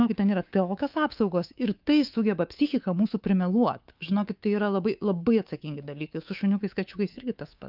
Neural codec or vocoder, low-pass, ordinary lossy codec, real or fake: codec, 24 kHz, 3.1 kbps, DualCodec; 5.4 kHz; Opus, 24 kbps; fake